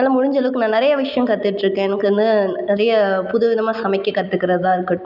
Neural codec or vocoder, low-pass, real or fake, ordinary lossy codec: none; 5.4 kHz; real; none